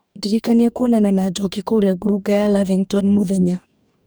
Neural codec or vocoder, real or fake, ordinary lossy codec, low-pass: codec, 44.1 kHz, 2.6 kbps, DAC; fake; none; none